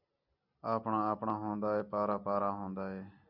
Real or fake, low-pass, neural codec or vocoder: real; 5.4 kHz; none